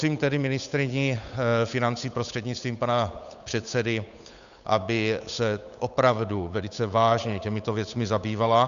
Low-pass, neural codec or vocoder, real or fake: 7.2 kHz; codec, 16 kHz, 8 kbps, FunCodec, trained on Chinese and English, 25 frames a second; fake